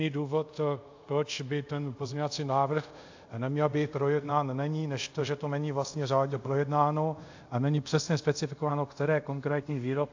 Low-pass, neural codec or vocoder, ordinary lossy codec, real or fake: 7.2 kHz; codec, 24 kHz, 0.5 kbps, DualCodec; AAC, 48 kbps; fake